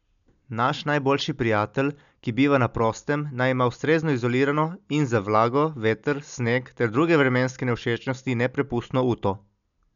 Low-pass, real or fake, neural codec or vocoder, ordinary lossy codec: 7.2 kHz; real; none; none